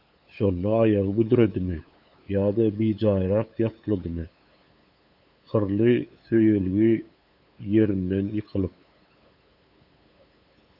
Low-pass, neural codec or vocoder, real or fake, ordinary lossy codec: 5.4 kHz; codec, 16 kHz, 8 kbps, FunCodec, trained on LibriTTS, 25 frames a second; fake; AAC, 48 kbps